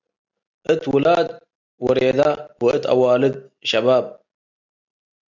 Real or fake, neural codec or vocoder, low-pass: real; none; 7.2 kHz